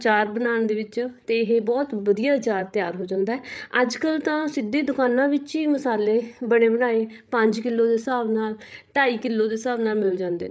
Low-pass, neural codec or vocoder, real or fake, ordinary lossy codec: none; codec, 16 kHz, 8 kbps, FreqCodec, larger model; fake; none